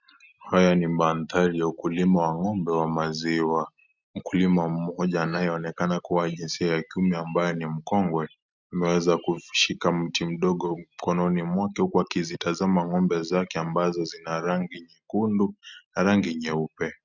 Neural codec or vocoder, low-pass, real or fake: none; 7.2 kHz; real